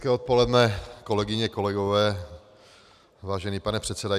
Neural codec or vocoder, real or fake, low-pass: none; real; 14.4 kHz